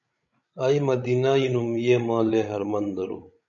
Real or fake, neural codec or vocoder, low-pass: fake; codec, 16 kHz, 16 kbps, FreqCodec, larger model; 7.2 kHz